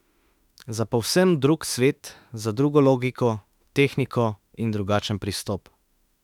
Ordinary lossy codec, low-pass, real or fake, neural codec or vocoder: none; 19.8 kHz; fake; autoencoder, 48 kHz, 32 numbers a frame, DAC-VAE, trained on Japanese speech